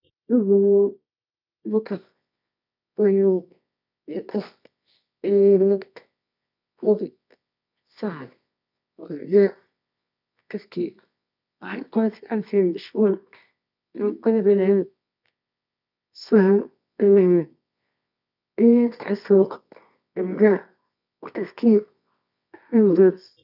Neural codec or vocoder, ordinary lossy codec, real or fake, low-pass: codec, 24 kHz, 0.9 kbps, WavTokenizer, medium music audio release; none; fake; 5.4 kHz